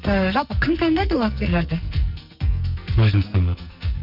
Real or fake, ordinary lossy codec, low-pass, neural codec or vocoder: fake; none; 5.4 kHz; codec, 44.1 kHz, 2.6 kbps, SNAC